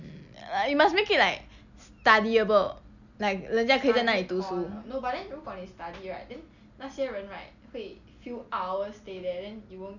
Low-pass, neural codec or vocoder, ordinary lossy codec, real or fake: 7.2 kHz; none; none; real